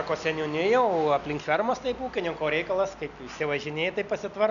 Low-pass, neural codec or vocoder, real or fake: 7.2 kHz; none; real